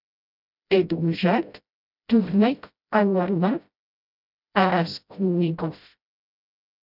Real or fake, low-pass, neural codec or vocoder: fake; 5.4 kHz; codec, 16 kHz, 0.5 kbps, FreqCodec, smaller model